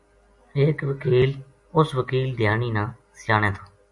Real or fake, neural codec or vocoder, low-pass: real; none; 10.8 kHz